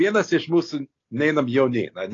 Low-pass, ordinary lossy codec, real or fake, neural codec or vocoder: 7.2 kHz; AAC, 32 kbps; real; none